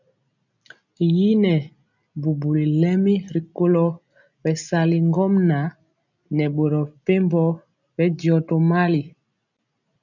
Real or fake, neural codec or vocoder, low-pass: real; none; 7.2 kHz